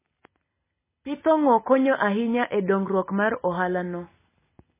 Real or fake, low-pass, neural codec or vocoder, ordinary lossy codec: real; 3.6 kHz; none; MP3, 16 kbps